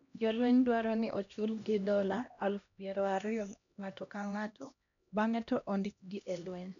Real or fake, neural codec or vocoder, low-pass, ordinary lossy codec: fake; codec, 16 kHz, 1 kbps, X-Codec, HuBERT features, trained on LibriSpeech; 7.2 kHz; none